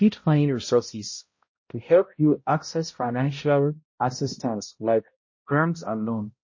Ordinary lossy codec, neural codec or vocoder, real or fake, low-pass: MP3, 32 kbps; codec, 16 kHz, 0.5 kbps, X-Codec, HuBERT features, trained on balanced general audio; fake; 7.2 kHz